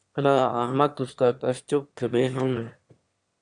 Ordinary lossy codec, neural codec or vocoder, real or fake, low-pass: Opus, 64 kbps; autoencoder, 22.05 kHz, a latent of 192 numbers a frame, VITS, trained on one speaker; fake; 9.9 kHz